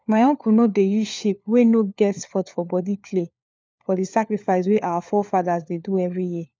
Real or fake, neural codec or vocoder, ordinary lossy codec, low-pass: fake; codec, 16 kHz, 4 kbps, FunCodec, trained on LibriTTS, 50 frames a second; none; none